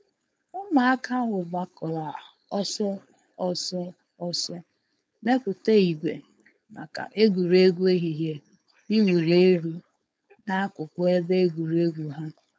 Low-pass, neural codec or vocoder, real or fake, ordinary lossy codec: none; codec, 16 kHz, 4.8 kbps, FACodec; fake; none